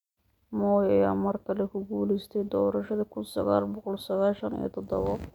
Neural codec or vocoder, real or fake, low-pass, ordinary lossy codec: none; real; 19.8 kHz; none